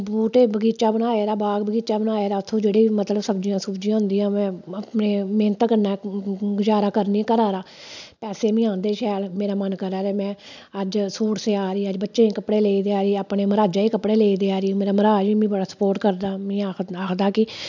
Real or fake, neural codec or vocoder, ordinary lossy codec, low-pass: real; none; none; 7.2 kHz